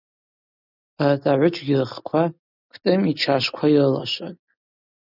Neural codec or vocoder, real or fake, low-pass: none; real; 5.4 kHz